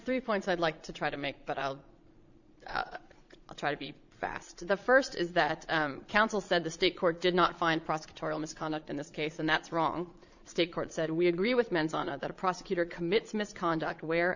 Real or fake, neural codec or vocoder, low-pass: fake; vocoder, 22.05 kHz, 80 mel bands, Vocos; 7.2 kHz